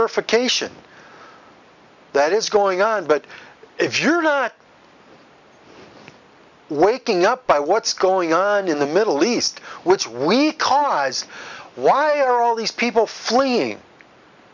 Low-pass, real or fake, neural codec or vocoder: 7.2 kHz; real; none